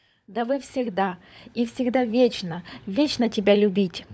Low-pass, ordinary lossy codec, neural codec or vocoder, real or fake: none; none; codec, 16 kHz, 16 kbps, FunCodec, trained on LibriTTS, 50 frames a second; fake